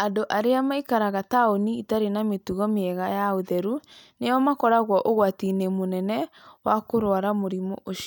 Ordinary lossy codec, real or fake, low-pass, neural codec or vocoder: none; real; none; none